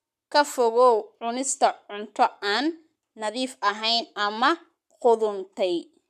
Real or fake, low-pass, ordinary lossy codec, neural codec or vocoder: fake; 14.4 kHz; none; codec, 44.1 kHz, 7.8 kbps, Pupu-Codec